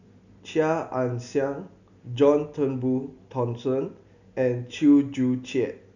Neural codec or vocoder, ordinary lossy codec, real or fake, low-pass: none; none; real; 7.2 kHz